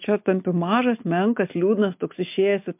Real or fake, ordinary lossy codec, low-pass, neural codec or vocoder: real; MP3, 32 kbps; 3.6 kHz; none